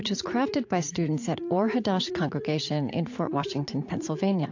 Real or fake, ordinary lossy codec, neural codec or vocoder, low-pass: fake; AAC, 48 kbps; vocoder, 44.1 kHz, 80 mel bands, Vocos; 7.2 kHz